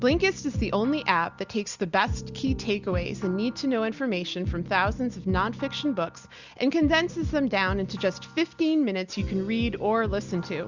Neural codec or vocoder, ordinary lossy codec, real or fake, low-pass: none; Opus, 64 kbps; real; 7.2 kHz